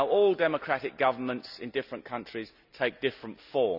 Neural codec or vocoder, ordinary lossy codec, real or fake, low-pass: none; none; real; 5.4 kHz